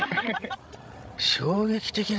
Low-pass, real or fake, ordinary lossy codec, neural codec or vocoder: none; fake; none; codec, 16 kHz, 16 kbps, FreqCodec, larger model